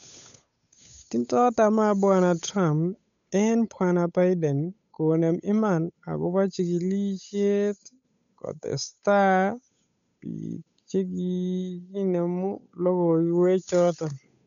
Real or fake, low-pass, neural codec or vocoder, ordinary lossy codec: fake; 7.2 kHz; codec, 16 kHz, 8 kbps, FunCodec, trained on Chinese and English, 25 frames a second; none